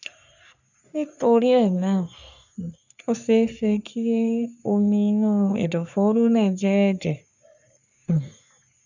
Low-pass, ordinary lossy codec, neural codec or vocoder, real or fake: 7.2 kHz; none; codec, 44.1 kHz, 3.4 kbps, Pupu-Codec; fake